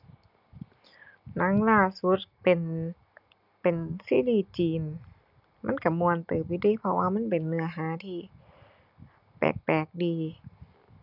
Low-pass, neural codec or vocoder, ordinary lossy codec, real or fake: 5.4 kHz; none; none; real